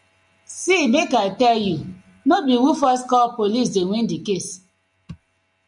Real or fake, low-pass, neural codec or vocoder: real; 10.8 kHz; none